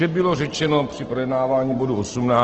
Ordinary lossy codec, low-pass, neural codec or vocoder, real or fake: Opus, 16 kbps; 7.2 kHz; none; real